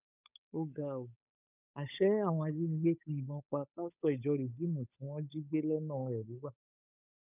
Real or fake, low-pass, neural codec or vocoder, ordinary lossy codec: fake; 3.6 kHz; codec, 16 kHz, 8 kbps, FunCodec, trained on Chinese and English, 25 frames a second; none